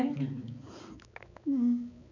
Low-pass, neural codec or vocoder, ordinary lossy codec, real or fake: 7.2 kHz; codec, 16 kHz, 4 kbps, X-Codec, HuBERT features, trained on balanced general audio; none; fake